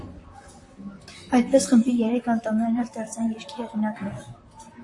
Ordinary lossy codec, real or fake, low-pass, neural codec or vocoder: AAC, 48 kbps; fake; 10.8 kHz; vocoder, 44.1 kHz, 128 mel bands, Pupu-Vocoder